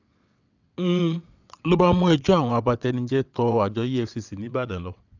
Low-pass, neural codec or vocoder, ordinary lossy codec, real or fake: 7.2 kHz; vocoder, 22.05 kHz, 80 mel bands, WaveNeXt; none; fake